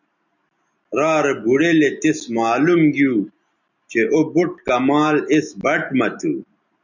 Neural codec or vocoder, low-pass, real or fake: none; 7.2 kHz; real